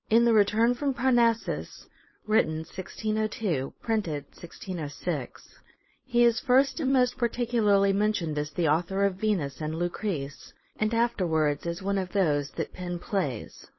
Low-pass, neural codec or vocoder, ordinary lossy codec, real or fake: 7.2 kHz; codec, 16 kHz, 4.8 kbps, FACodec; MP3, 24 kbps; fake